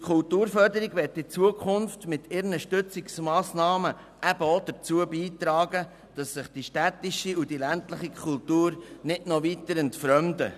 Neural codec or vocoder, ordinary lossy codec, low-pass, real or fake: none; none; 14.4 kHz; real